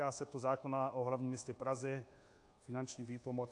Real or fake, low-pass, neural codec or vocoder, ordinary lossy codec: fake; 10.8 kHz; codec, 24 kHz, 1.2 kbps, DualCodec; AAC, 48 kbps